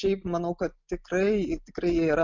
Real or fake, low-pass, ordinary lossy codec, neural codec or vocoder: real; 7.2 kHz; MP3, 64 kbps; none